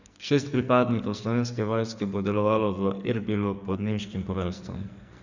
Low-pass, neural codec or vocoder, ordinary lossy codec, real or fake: 7.2 kHz; codec, 44.1 kHz, 2.6 kbps, SNAC; none; fake